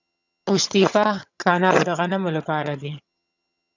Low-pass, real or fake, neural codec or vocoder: 7.2 kHz; fake; vocoder, 22.05 kHz, 80 mel bands, HiFi-GAN